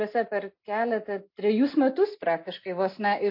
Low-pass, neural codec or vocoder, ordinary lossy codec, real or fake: 5.4 kHz; none; MP3, 32 kbps; real